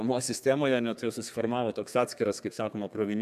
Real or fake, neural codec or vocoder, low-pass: fake; codec, 32 kHz, 1.9 kbps, SNAC; 14.4 kHz